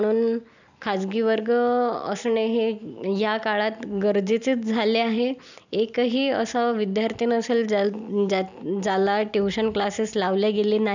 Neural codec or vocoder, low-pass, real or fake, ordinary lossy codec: none; 7.2 kHz; real; none